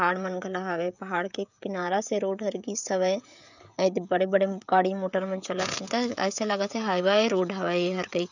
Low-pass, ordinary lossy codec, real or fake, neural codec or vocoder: 7.2 kHz; none; fake; codec, 16 kHz, 16 kbps, FreqCodec, smaller model